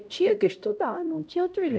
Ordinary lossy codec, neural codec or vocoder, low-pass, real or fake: none; codec, 16 kHz, 0.5 kbps, X-Codec, HuBERT features, trained on LibriSpeech; none; fake